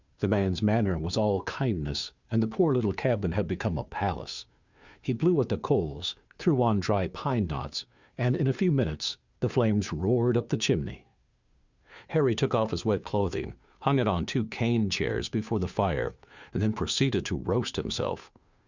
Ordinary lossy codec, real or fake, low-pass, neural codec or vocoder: Opus, 64 kbps; fake; 7.2 kHz; codec, 16 kHz, 2 kbps, FunCodec, trained on Chinese and English, 25 frames a second